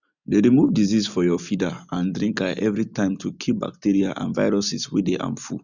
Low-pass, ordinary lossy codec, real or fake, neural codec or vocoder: 7.2 kHz; none; real; none